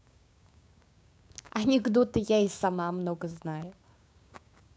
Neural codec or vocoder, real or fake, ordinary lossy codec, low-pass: codec, 16 kHz, 6 kbps, DAC; fake; none; none